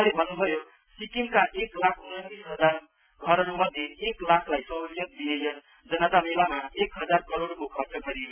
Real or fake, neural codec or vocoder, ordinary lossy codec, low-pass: real; none; none; 3.6 kHz